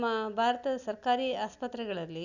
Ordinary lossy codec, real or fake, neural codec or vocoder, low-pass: none; real; none; 7.2 kHz